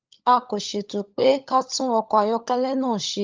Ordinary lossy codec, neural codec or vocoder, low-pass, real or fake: Opus, 24 kbps; codec, 16 kHz, 16 kbps, FunCodec, trained on LibriTTS, 50 frames a second; 7.2 kHz; fake